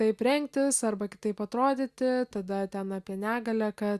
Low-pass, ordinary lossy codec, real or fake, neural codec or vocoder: 14.4 kHz; Opus, 64 kbps; real; none